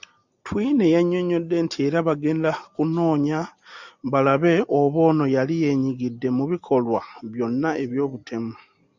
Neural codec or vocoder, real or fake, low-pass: none; real; 7.2 kHz